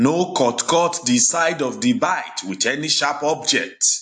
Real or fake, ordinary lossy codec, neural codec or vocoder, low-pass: real; AAC, 64 kbps; none; 9.9 kHz